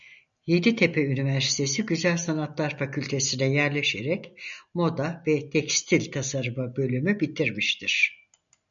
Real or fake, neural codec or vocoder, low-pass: real; none; 7.2 kHz